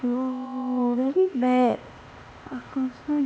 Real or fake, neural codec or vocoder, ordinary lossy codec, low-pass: fake; codec, 16 kHz, 0.8 kbps, ZipCodec; none; none